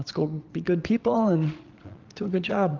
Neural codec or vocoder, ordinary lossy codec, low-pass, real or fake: vocoder, 22.05 kHz, 80 mel bands, Vocos; Opus, 24 kbps; 7.2 kHz; fake